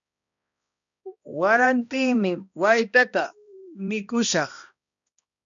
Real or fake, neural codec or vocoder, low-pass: fake; codec, 16 kHz, 1 kbps, X-Codec, HuBERT features, trained on balanced general audio; 7.2 kHz